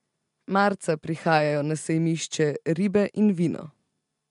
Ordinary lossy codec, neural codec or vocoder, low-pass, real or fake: MP3, 64 kbps; none; 10.8 kHz; real